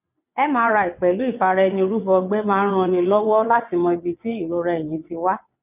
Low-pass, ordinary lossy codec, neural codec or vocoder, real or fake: 3.6 kHz; none; vocoder, 22.05 kHz, 80 mel bands, WaveNeXt; fake